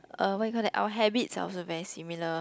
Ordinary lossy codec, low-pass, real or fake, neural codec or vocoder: none; none; real; none